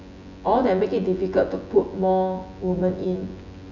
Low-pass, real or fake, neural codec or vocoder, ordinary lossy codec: 7.2 kHz; fake; vocoder, 24 kHz, 100 mel bands, Vocos; none